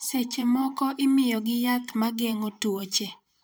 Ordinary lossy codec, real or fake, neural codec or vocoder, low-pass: none; fake; vocoder, 44.1 kHz, 128 mel bands, Pupu-Vocoder; none